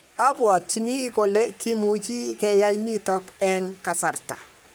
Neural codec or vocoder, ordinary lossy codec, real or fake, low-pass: codec, 44.1 kHz, 3.4 kbps, Pupu-Codec; none; fake; none